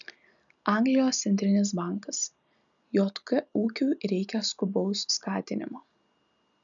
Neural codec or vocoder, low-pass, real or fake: none; 7.2 kHz; real